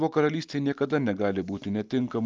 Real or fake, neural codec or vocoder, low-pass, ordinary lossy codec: real; none; 7.2 kHz; Opus, 24 kbps